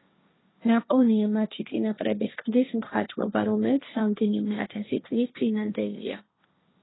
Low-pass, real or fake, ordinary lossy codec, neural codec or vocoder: 7.2 kHz; fake; AAC, 16 kbps; codec, 16 kHz, 1.1 kbps, Voila-Tokenizer